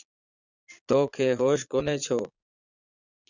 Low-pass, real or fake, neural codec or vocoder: 7.2 kHz; fake; vocoder, 22.05 kHz, 80 mel bands, Vocos